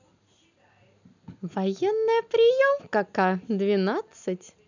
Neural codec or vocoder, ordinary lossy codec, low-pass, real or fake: none; none; 7.2 kHz; real